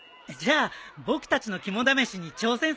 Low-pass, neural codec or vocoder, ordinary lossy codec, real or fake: none; none; none; real